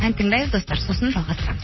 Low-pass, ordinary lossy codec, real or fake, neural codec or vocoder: 7.2 kHz; MP3, 24 kbps; fake; vocoder, 44.1 kHz, 128 mel bands, Pupu-Vocoder